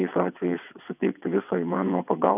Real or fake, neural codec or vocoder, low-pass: fake; vocoder, 22.05 kHz, 80 mel bands, WaveNeXt; 3.6 kHz